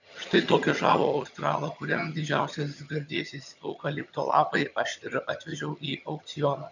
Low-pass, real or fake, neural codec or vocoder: 7.2 kHz; fake; vocoder, 22.05 kHz, 80 mel bands, HiFi-GAN